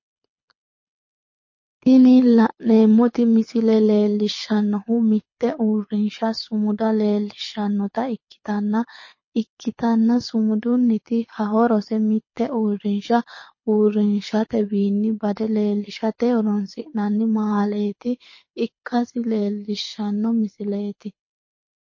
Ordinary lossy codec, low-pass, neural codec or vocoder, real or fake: MP3, 32 kbps; 7.2 kHz; codec, 24 kHz, 6 kbps, HILCodec; fake